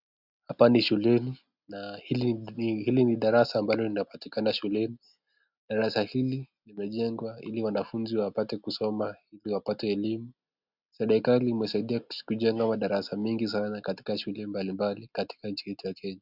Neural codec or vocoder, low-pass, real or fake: none; 5.4 kHz; real